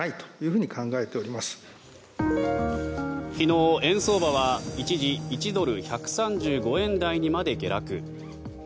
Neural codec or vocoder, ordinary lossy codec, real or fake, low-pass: none; none; real; none